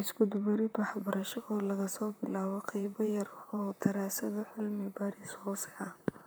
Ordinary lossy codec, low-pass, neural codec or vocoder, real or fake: none; none; vocoder, 44.1 kHz, 128 mel bands, Pupu-Vocoder; fake